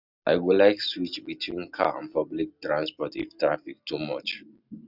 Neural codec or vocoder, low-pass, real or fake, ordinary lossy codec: none; 5.4 kHz; real; none